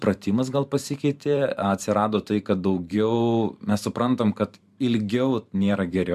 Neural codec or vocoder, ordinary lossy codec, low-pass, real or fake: vocoder, 44.1 kHz, 128 mel bands every 512 samples, BigVGAN v2; MP3, 96 kbps; 14.4 kHz; fake